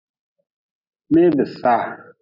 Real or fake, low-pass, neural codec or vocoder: real; 5.4 kHz; none